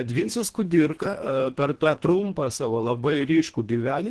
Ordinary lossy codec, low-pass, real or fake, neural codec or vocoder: Opus, 16 kbps; 10.8 kHz; fake; codec, 24 kHz, 1.5 kbps, HILCodec